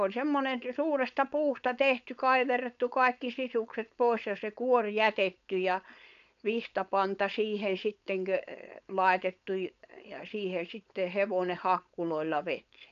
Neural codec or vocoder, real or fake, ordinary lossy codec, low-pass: codec, 16 kHz, 4.8 kbps, FACodec; fake; none; 7.2 kHz